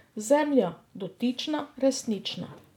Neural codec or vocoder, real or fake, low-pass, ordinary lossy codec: none; real; 19.8 kHz; none